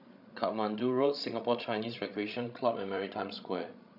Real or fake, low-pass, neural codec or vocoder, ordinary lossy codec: fake; 5.4 kHz; codec, 16 kHz, 16 kbps, FreqCodec, larger model; none